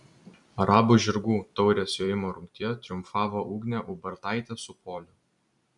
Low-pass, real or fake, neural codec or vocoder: 10.8 kHz; fake; vocoder, 44.1 kHz, 128 mel bands every 256 samples, BigVGAN v2